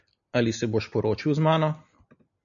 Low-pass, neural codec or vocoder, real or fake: 7.2 kHz; none; real